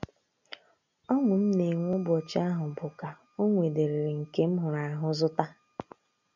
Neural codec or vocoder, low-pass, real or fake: none; 7.2 kHz; real